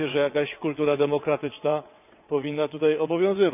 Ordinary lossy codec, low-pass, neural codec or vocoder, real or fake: none; 3.6 kHz; vocoder, 22.05 kHz, 80 mel bands, WaveNeXt; fake